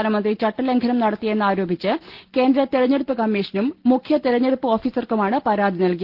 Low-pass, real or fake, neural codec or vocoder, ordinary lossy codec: 5.4 kHz; real; none; Opus, 16 kbps